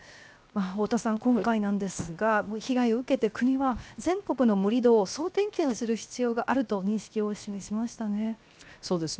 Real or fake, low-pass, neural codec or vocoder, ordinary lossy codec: fake; none; codec, 16 kHz, 0.7 kbps, FocalCodec; none